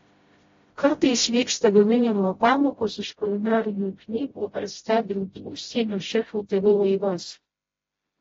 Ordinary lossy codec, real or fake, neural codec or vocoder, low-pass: AAC, 24 kbps; fake; codec, 16 kHz, 0.5 kbps, FreqCodec, smaller model; 7.2 kHz